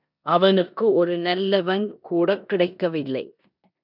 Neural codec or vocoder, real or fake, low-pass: codec, 16 kHz in and 24 kHz out, 0.9 kbps, LongCat-Audio-Codec, four codebook decoder; fake; 5.4 kHz